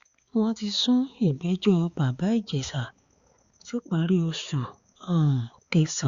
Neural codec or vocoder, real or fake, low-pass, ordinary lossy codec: codec, 16 kHz, 4 kbps, X-Codec, HuBERT features, trained on balanced general audio; fake; 7.2 kHz; Opus, 64 kbps